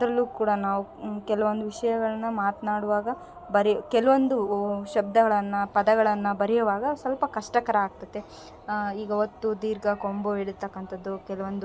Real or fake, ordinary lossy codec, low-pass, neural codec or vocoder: real; none; none; none